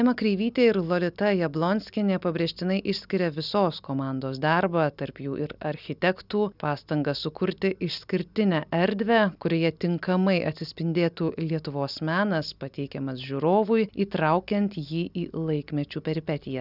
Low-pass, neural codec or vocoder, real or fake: 5.4 kHz; none; real